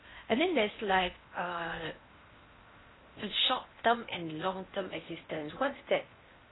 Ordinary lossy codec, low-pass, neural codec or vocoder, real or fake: AAC, 16 kbps; 7.2 kHz; codec, 16 kHz in and 24 kHz out, 0.6 kbps, FocalCodec, streaming, 4096 codes; fake